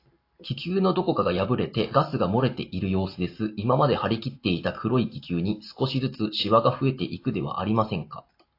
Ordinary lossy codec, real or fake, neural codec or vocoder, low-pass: AAC, 32 kbps; real; none; 5.4 kHz